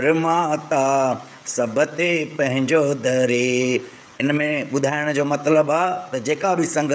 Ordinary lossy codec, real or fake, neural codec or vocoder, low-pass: none; fake; codec, 16 kHz, 16 kbps, FreqCodec, smaller model; none